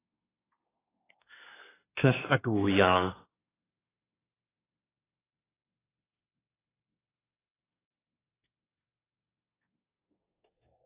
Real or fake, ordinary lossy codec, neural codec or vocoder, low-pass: fake; AAC, 16 kbps; codec, 24 kHz, 1 kbps, SNAC; 3.6 kHz